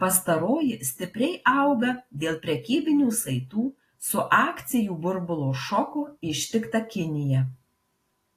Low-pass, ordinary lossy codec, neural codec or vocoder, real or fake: 14.4 kHz; AAC, 48 kbps; none; real